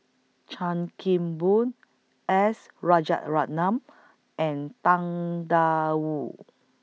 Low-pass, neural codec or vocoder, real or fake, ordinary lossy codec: none; none; real; none